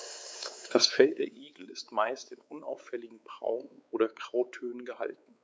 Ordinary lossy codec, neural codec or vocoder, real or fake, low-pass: none; codec, 16 kHz, 4 kbps, X-Codec, WavLM features, trained on Multilingual LibriSpeech; fake; none